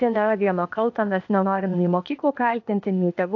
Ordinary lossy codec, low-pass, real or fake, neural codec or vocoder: AAC, 48 kbps; 7.2 kHz; fake; codec, 16 kHz, 0.8 kbps, ZipCodec